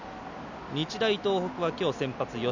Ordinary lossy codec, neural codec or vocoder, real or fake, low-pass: none; none; real; 7.2 kHz